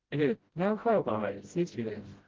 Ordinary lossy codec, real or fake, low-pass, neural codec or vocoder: Opus, 16 kbps; fake; 7.2 kHz; codec, 16 kHz, 0.5 kbps, FreqCodec, smaller model